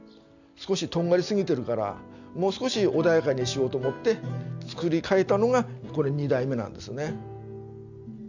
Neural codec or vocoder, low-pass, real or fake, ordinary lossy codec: none; 7.2 kHz; real; none